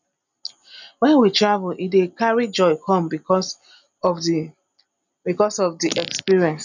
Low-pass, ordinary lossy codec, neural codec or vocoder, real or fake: 7.2 kHz; none; none; real